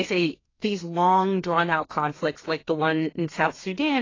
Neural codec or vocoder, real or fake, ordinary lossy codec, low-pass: codec, 32 kHz, 1.9 kbps, SNAC; fake; AAC, 32 kbps; 7.2 kHz